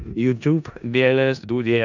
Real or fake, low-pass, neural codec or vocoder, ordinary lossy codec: fake; 7.2 kHz; codec, 16 kHz in and 24 kHz out, 0.4 kbps, LongCat-Audio-Codec, four codebook decoder; none